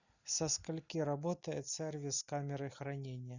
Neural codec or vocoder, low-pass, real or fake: none; 7.2 kHz; real